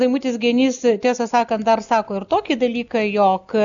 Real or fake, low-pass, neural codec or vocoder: real; 7.2 kHz; none